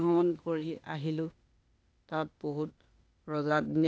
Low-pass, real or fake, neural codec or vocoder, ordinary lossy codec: none; fake; codec, 16 kHz, 0.8 kbps, ZipCodec; none